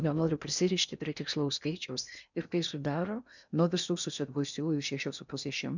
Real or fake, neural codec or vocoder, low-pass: fake; codec, 16 kHz in and 24 kHz out, 0.6 kbps, FocalCodec, streaming, 4096 codes; 7.2 kHz